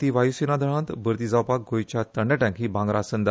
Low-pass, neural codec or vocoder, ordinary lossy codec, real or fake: none; none; none; real